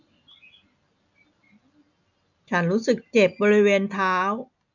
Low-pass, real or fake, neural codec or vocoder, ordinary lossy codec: 7.2 kHz; real; none; none